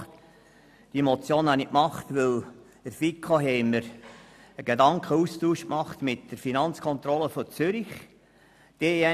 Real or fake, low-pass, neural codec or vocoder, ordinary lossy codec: real; 14.4 kHz; none; none